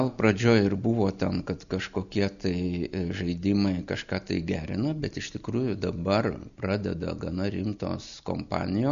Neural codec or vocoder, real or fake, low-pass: none; real; 7.2 kHz